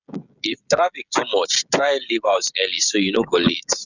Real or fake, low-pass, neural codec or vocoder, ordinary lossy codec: fake; 7.2 kHz; codec, 16 kHz, 16 kbps, FreqCodec, smaller model; none